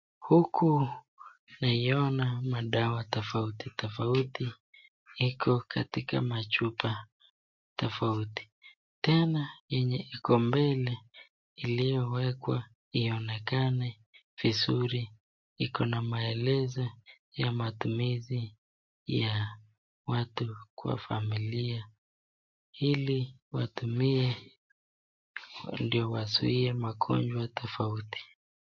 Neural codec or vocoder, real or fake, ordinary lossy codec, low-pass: none; real; MP3, 48 kbps; 7.2 kHz